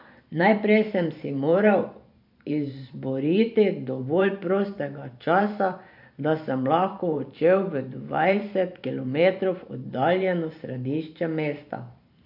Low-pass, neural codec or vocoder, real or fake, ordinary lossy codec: 5.4 kHz; vocoder, 44.1 kHz, 128 mel bands every 512 samples, BigVGAN v2; fake; AAC, 48 kbps